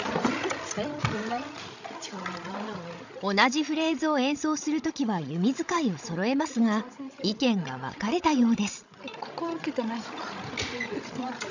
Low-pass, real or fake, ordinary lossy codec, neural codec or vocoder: 7.2 kHz; fake; none; codec, 16 kHz, 16 kbps, FreqCodec, larger model